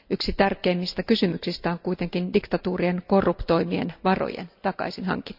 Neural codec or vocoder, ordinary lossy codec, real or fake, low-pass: none; none; real; 5.4 kHz